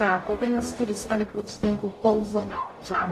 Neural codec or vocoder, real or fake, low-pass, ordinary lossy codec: codec, 44.1 kHz, 0.9 kbps, DAC; fake; 14.4 kHz; AAC, 48 kbps